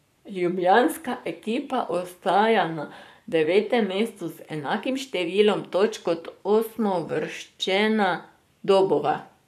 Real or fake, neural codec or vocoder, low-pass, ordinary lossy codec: fake; codec, 44.1 kHz, 7.8 kbps, Pupu-Codec; 14.4 kHz; none